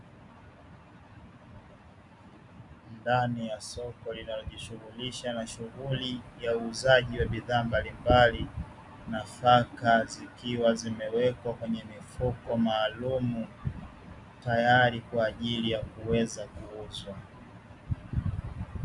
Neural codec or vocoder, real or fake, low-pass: none; real; 10.8 kHz